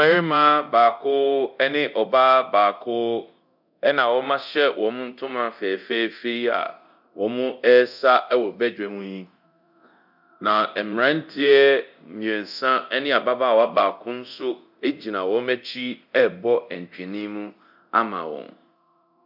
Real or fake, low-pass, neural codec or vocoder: fake; 5.4 kHz; codec, 24 kHz, 0.9 kbps, DualCodec